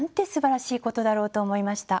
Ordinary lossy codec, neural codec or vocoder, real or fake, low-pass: none; none; real; none